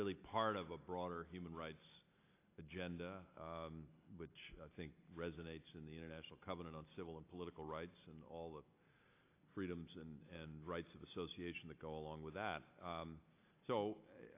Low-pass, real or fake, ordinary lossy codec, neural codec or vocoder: 3.6 kHz; real; AAC, 24 kbps; none